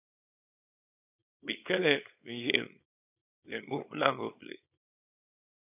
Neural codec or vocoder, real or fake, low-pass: codec, 24 kHz, 0.9 kbps, WavTokenizer, small release; fake; 3.6 kHz